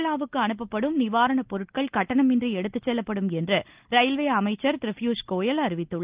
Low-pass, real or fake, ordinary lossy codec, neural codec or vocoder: 3.6 kHz; real; Opus, 32 kbps; none